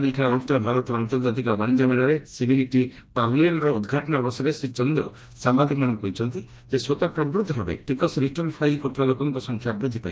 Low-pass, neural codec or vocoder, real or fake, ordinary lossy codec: none; codec, 16 kHz, 1 kbps, FreqCodec, smaller model; fake; none